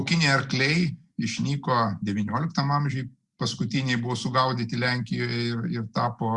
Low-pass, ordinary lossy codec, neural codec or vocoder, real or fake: 10.8 kHz; Opus, 64 kbps; none; real